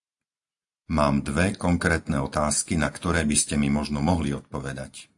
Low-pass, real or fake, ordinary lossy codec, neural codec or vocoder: 10.8 kHz; real; AAC, 64 kbps; none